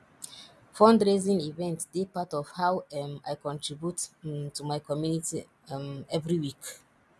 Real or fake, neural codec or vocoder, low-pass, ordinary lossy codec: real; none; none; none